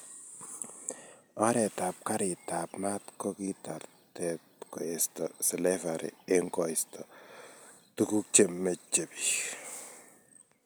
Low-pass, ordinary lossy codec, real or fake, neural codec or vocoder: none; none; real; none